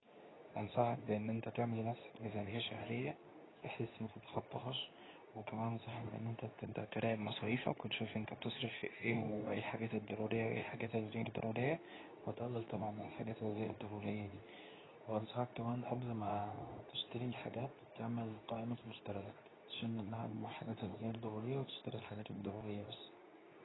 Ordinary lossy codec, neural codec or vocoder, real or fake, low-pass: AAC, 16 kbps; codec, 24 kHz, 0.9 kbps, WavTokenizer, medium speech release version 2; fake; 7.2 kHz